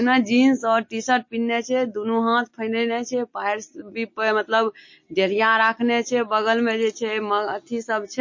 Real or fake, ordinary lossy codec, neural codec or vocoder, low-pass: real; MP3, 32 kbps; none; 7.2 kHz